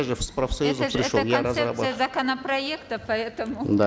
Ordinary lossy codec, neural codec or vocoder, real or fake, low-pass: none; none; real; none